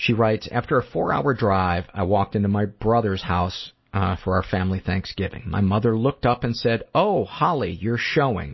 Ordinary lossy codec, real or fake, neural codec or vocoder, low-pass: MP3, 24 kbps; real; none; 7.2 kHz